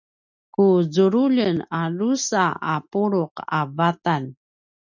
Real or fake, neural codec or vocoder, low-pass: real; none; 7.2 kHz